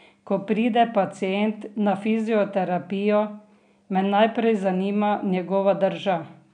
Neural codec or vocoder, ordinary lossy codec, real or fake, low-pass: none; none; real; 9.9 kHz